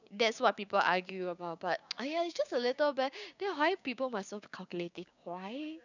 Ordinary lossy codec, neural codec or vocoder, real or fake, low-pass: none; codec, 16 kHz, 6 kbps, DAC; fake; 7.2 kHz